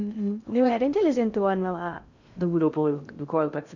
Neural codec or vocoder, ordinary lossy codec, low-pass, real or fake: codec, 16 kHz in and 24 kHz out, 0.6 kbps, FocalCodec, streaming, 2048 codes; none; 7.2 kHz; fake